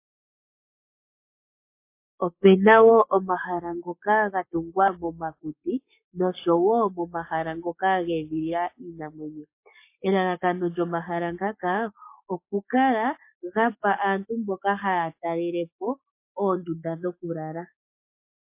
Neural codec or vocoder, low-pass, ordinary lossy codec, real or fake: codec, 44.1 kHz, 7.8 kbps, Pupu-Codec; 3.6 kHz; MP3, 24 kbps; fake